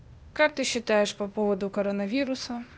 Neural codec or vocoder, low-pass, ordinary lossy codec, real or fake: codec, 16 kHz, 0.8 kbps, ZipCodec; none; none; fake